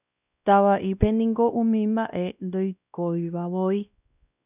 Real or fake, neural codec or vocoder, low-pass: fake; codec, 16 kHz, 1 kbps, X-Codec, WavLM features, trained on Multilingual LibriSpeech; 3.6 kHz